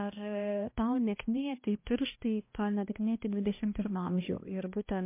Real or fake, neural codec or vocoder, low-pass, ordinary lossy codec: fake; codec, 16 kHz, 1 kbps, X-Codec, HuBERT features, trained on balanced general audio; 3.6 kHz; MP3, 24 kbps